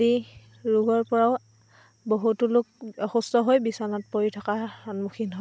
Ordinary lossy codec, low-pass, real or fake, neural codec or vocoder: none; none; real; none